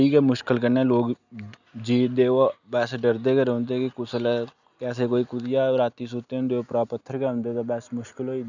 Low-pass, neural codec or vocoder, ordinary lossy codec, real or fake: 7.2 kHz; none; none; real